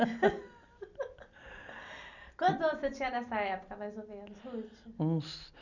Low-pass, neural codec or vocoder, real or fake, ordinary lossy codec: 7.2 kHz; none; real; none